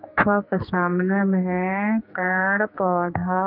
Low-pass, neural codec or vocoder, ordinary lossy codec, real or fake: 5.4 kHz; codec, 44.1 kHz, 2.6 kbps, SNAC; none; fake